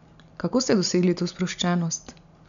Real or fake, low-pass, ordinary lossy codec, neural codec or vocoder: real; 7.2 kHz; none; none